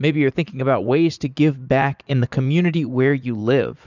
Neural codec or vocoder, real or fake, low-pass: vocoder, 44.1 kHz, 80 mel bands, Vocos; fake; 7.2 kHz